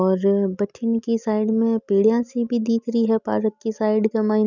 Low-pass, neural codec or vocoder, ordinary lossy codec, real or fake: 7.2 kHz; none; none; real